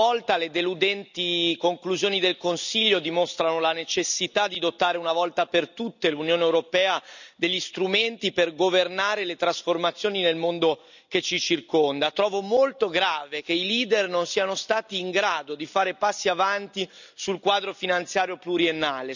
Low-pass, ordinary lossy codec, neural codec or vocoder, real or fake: 7.2 kHz; none; none; real